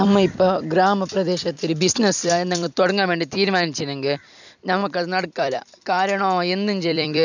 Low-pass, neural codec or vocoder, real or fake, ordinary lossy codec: 7.2 kHz; vocoder, 44.1 kHz, 128 mel bands every 256 samples, BigVGAN v2; fake; none